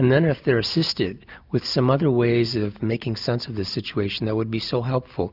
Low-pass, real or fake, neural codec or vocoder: 5.4 kHz; real; none